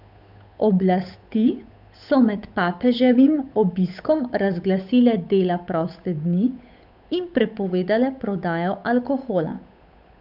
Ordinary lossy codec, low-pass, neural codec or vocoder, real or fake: none; 5.4 kHz; codec, 16 kHz, 8 kbps, FunCodec, trained on Chinese and English, 25 frames a second; fake